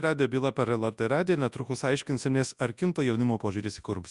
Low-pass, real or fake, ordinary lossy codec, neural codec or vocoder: 10.8 kHz; fake; Opus, 64 kbps; codec, 24 kHz, 0.9 kbps, WavTokenizer, large speech release